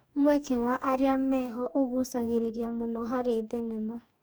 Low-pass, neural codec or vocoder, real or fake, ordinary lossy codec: none; codec, 44.1 kHz, 2.6 kbps, DAC; fake; none